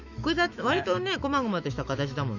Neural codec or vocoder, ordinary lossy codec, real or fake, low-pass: autoencoder, 48 kHz, 128 numbers a frame, DAC-VAE, trained on Japanese speech; none; fake; 7.2 kHz